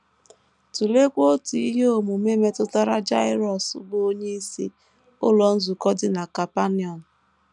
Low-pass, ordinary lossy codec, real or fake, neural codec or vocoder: 10.8 kHz; none; real; none